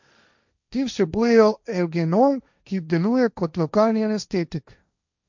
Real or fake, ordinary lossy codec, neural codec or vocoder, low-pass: fake; none; codec, 16 kHz, 1.1 kbps, Voila-Tokenizer; 7.2 kHz